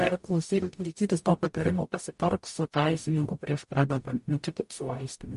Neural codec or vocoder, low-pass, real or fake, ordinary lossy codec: codec, 44.1 kHz, 0.9 kbps, DAC; 14.4 kHz; fake; MP3, 48 kbps